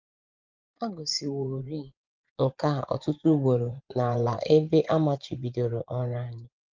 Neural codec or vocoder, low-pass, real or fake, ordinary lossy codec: vocoder, 24 kHz, 100 mel bands, Vocos; 7.2 kHz; fake; Opus, 32 kbps